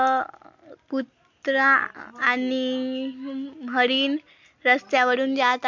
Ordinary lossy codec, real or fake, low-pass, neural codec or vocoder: MP3, 48 kbps; real; 7.2 kHz; none